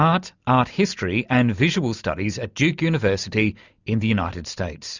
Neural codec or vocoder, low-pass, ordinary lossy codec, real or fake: none; 7.2 kHz; Opus, 64 kbps; real